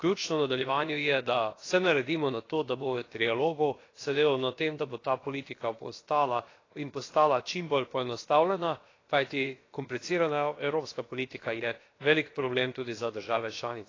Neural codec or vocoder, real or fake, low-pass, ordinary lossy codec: codec, 16 kHz, about 1 kbps, DyCAST, with the encoder's durations; fake; 7.2 kHz; AAC, 32 kbps